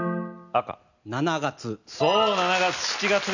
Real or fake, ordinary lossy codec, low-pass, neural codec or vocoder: real; none; 7.2 kHz; none